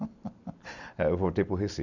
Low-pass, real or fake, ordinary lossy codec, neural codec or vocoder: 7.2 kHz; real; none; none